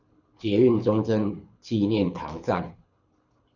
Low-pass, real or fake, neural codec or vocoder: 7.2 kHz; fake; codec, 24 kHz, 6 kbps, HILCodec